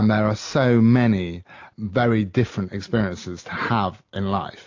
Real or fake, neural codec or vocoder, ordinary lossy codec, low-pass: real; none; AAC, 48 kbps; 7.2 kHz